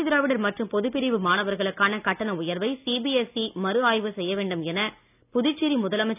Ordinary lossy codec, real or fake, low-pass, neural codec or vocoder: none; real; 3.6 kHz; none